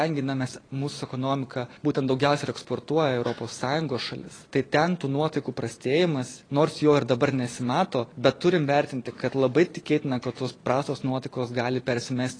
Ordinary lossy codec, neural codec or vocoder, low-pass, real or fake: AAC, 32 kbps; vocoder, 44.1 kHz, 128 mel bands every 512 samples, BigVGAN v2; 9.9 kHz; fake